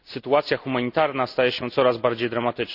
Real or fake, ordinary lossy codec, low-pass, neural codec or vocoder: real; none; 5.4 kHz; none